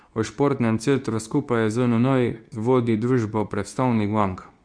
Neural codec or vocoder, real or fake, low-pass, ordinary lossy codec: codec, 24 kHz, 0.9 kbps, WavTokenizer, medium speech release version 2; fake; 9.9 kHz; Opus, 64 kbps